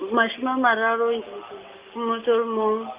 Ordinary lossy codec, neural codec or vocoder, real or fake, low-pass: Opus, 24 kbps; none; real; 3.6 kHz